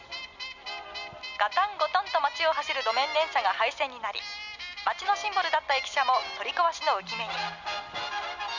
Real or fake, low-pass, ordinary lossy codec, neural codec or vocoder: real; 7.2 kHz; none; none